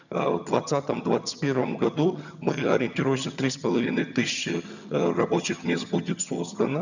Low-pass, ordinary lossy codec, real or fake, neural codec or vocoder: 7.2 kHz; none; fake; vocoder, 22.05 kHz, 80 mel bands, HiFi-GAN